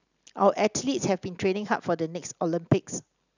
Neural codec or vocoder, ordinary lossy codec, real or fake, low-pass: none; none; real; 7.2 kHz